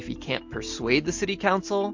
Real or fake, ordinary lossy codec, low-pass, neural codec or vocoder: real; MP3, 48 kbps; 7.2 kHz; none